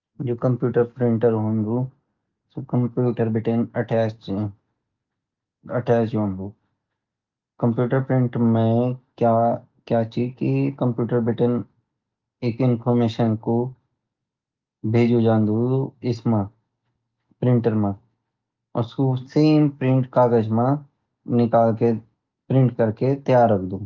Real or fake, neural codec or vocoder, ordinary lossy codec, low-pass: real; none; Opus, 24 kbps; 7.2 kHz